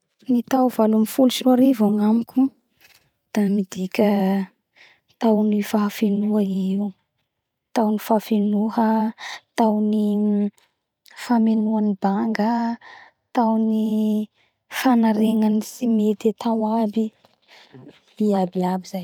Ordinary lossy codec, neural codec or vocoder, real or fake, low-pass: none; vocoder, 44.1 kHz, 128 mel bands every 512 samples, BigVGAN v2; fake; 19.8 kHz